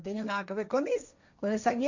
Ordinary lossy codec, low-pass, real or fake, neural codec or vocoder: none; 7.2 kHz; fake; codec, 16 kHz, 1.1 kbps, Voila-Tokenizer